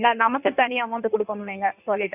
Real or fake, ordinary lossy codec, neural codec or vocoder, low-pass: fake; none; codec, 16 kHz in and 24 kHz out, 1.1 kbps, FireRedTTS-2 codec; 3.6 kHz